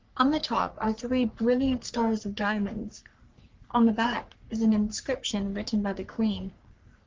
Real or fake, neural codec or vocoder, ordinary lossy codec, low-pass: fake; codec, 44.1 kHz, 3.4 kbps, Pupu-Codec; Opus, 32 kbps; 7.2 kHz